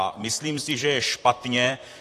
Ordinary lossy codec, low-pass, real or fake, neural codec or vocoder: AAC, 48 kbps; 14.4 kHz; fake; vocoder, 44.1 kHz, 128 mel bands, Pupu-Vocoder